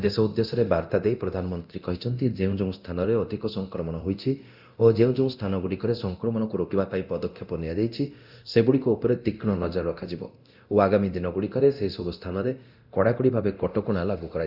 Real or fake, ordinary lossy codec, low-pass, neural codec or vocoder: fake; none; 5.4 kHz; codec, 24 kHz, 0.9 kbps, DualCodec